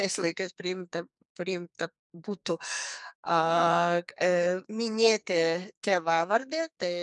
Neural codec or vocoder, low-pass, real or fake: codec, 44.1 kHz, 2.6 kbps, SNAC; 10.8 kHz; fake